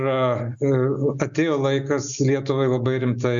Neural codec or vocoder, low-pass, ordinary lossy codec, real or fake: none; 7.2 kHz; MP3, 64 kbps; real